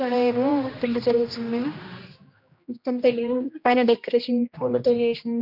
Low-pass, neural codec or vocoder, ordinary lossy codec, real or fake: 5.4 kHz; codec, 16 kHz, 1 kbps, X-Codec, HuBERT features, trained on general audio; none; fake